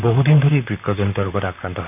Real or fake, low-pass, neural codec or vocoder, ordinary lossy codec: fake; 3.6 kHz; vocoder, 44.1 kHz, 128 mel bands, Pupu-Vocoder; none